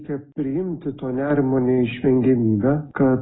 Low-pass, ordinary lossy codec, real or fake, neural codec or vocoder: 7.2 kHz; AAC, 16 kbps; real; none